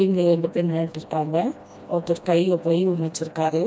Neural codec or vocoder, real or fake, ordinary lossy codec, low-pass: codec, 16 kHz, 1 kbps, FreqCodec, smaller model; fake; none; none